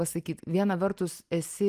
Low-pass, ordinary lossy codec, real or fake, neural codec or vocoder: 14.4 kHz; Opus, 24 kbps; real; none